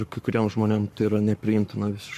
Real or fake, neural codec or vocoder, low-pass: fake; codec, 44.1 kHz, 7.8 kbps, Pupu-Codec; 14.4 kHz